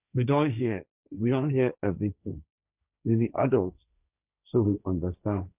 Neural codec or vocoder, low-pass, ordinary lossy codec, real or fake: codec, 16 kHz, 1.1 kbps, Voila-Tokenizer; 3.6 kHz; none; fake